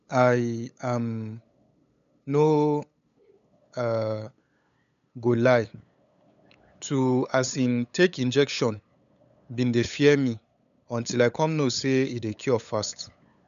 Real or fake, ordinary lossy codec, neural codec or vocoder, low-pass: fake; none; codec, 16 kHz, 8 kbps, FunCodec, trained on LibriTTS, 25 frames a second; 7.2 kHz